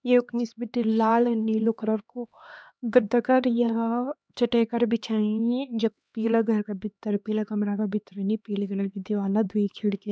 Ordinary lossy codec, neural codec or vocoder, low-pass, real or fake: none; codec, 16 kHz, 2 kbps, X-Codec, HuBERT features, trained on LibriSpeech; none; fake